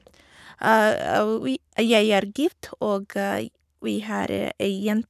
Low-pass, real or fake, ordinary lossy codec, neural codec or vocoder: 14.4 kHz; fake; none; codec, 44.1 kHz, 7.8 kbps, Pupu-Codec